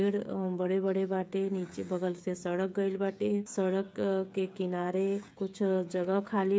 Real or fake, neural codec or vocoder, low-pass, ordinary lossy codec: fake; codec, 16 kHz, 8 kbps, FreqCodec, smaller model; none; none